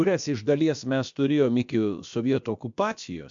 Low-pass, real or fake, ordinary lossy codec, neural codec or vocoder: 7.2 kHz; fake; MP3, 64 kbps; codec, 16 kHz, about 1 kbps, DyCAST, with the encoder's durations